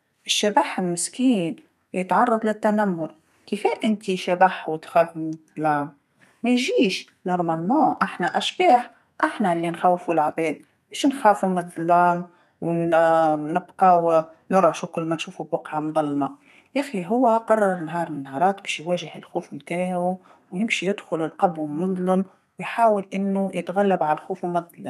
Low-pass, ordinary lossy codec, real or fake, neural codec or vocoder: 14.4 kHz; none; fake; codec, 32 kHz, 1.9 kbps, SNAC